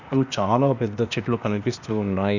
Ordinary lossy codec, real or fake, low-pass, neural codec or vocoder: none; fake; 7.2 kHz; codec, 16 kHz, 0.8 kbps, ZipCodec